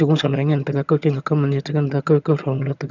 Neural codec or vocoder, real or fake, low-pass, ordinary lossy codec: vocoder, 22.05 kHz, 80 mel bands, HiFi-GAN; fake; 7.2 kHz; none